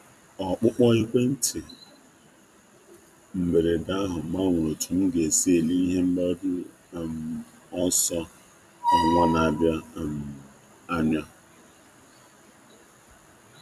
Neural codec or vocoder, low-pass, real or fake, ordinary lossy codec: vocoder, 44.1 kHz, 128 mel bands every 256 samples, BigVGAN v2; 14.4 kHz; fake; none